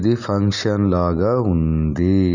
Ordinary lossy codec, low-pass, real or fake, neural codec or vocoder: none; 7.2 kHz; real; none